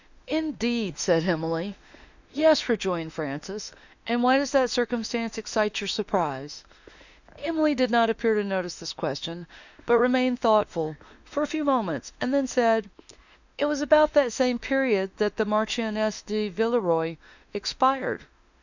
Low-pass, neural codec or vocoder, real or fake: 7.2 kHz; autoencoder, 48 kHz, 32 numbers a frame, DAC-VAE, trained on Japanese speech; fake